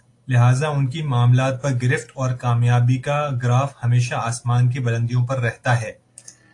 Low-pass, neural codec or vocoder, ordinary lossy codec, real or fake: 10.8 kHz; none; AAC, 48 kbps; real